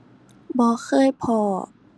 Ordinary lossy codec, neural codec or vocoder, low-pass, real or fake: none; none; none; real